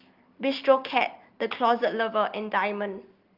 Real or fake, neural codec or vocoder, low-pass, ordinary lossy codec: real; none; 5.4 kHz; Opus, 32 kbps